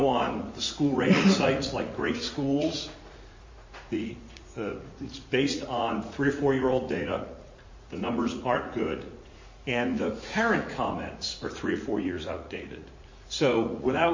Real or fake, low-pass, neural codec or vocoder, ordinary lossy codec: fake; 7.2 kHz; vocoder, 44.1 kHz, 80 mel bands, Vocos; MP3, 32 kbps